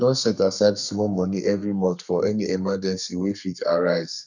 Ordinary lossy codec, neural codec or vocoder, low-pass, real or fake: none; codec, 32 kHz, 1.9 kbps, SNAC; 7.2 kHz; fake